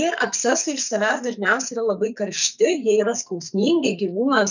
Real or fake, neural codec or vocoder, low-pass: fake; codec, 44.1 kHz, 2.6 kbps, SNAC; 7.2 kHz